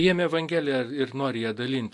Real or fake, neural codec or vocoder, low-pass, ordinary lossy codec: real; none; 10.8 kHz; AAC, 64 kbps